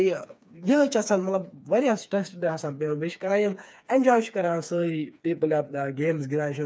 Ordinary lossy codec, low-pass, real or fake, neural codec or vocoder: none; none; fake; codec, 16 kHz, 4 kbps, FreqCodec, smaller model